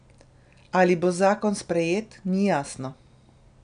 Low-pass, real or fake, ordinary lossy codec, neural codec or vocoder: 9.9 kHz; real; none; none